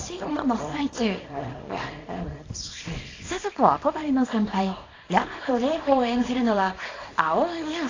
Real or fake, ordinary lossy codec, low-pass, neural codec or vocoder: fake; AAC, 32 kbps; 7.2 kHz; codec, 24 kHz, 0.9 kbps, WavTokenizer, small release